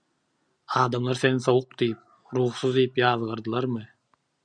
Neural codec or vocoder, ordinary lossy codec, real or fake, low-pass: none; MP3, 96 kbps; real; 9.9 kHz